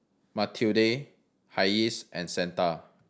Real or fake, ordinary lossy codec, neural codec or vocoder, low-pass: real; none; none; none